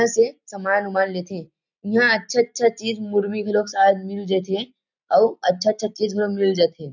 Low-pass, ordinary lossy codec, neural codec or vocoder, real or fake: 7.2 kHz; none; none; real